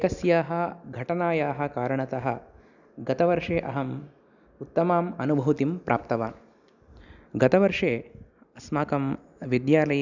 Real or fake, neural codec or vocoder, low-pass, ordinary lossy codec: real; none; 7.2 kHz; none